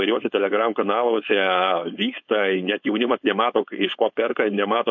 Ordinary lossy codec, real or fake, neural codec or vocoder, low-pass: MP3, 48 kbps; fake; codec, 16 kHz, 4.8 kbps, FACodec; 7.2 kHz